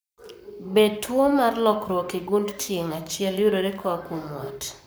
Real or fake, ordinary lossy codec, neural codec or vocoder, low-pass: fake; none; codec, 44.1 kHz, 7.8 kbps, Pupu-Codec; none